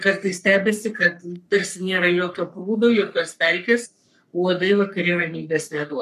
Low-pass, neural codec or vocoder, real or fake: 14.4 kHz; codec, 44.1 kHz, 3.4 kbps, Pupu-Codec; fake